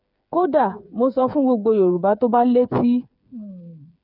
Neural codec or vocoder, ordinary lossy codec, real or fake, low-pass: codec, 16 kHz, 8 kbps, FreqCodec, smaller model; none; fake; 5.4 kHz